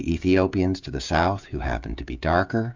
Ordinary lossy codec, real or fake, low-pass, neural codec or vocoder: MP3, 64 kbps; fake; 7.2 kHz; codec, 16 kHz, 16 kbps, FreqCodec, smaller model